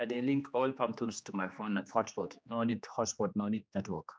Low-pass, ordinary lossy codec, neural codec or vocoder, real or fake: none; none; codec, 16 kHz, 2 kbps, X-Codec, HuBERT features, trained on general audio; fake